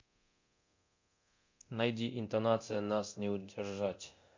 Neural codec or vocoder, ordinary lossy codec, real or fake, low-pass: codec, 24 kHz, 0.9 kbps, DualCodec; MP3, 48 kbps; fake; 7.2 kHz